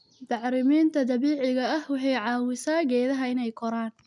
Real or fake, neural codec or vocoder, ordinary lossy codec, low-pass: real; none; MP3, 96 kbps; 10.8 kHz